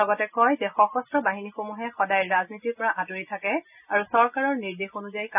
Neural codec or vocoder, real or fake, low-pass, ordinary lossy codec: none; real; 3.6 kHz; none